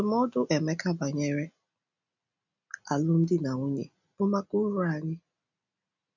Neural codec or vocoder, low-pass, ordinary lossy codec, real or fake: none; 7.2 kHz; none; real